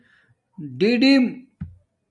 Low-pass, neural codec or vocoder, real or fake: 10.8 kHz; none; real